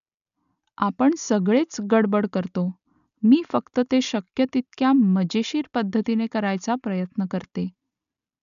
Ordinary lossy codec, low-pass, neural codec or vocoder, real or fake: none; 7.2 kHz; none; real